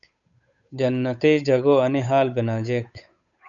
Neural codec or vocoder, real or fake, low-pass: codec, 16 kHz, 8 kbps, FunCodec, trained on Chinese and English, 25 frames a second; fake; 7.2 kHz